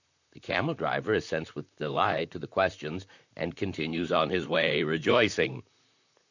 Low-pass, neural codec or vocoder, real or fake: 7.2 kHz; vocoder, 44.1 kHz, 128 mel bands, Pupu-Vocoder; fake